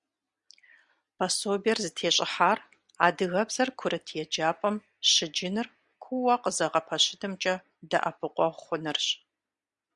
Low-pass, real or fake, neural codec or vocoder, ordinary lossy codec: 10.8 kHz; real; none; Opus, 64 kbps